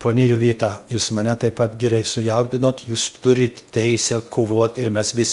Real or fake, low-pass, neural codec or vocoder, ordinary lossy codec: fake; 10.8 kHz; codec, 16 kHz in and 24 kHz out, 0.8 kbps, FocalCodec, streaming, 65536 codes; Opus, 64 kbps